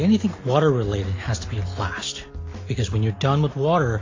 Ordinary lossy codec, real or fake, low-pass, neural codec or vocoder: AAC, 32 kbps; real; 7.2 kHz; none